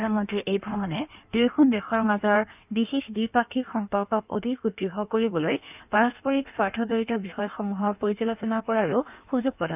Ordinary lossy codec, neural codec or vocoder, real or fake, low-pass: none; codec, 16 kHz in and 24 kHz out, 1.1 kbps, FireRedTTS-2 codec; fake; 3.6 kHz